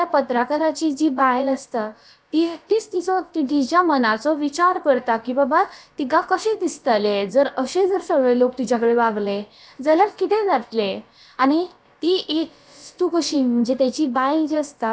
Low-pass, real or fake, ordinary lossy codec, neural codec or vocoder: none; fake; none; codec, 16 kHz, about 1 kbps, DyCAST, with the encoder's durations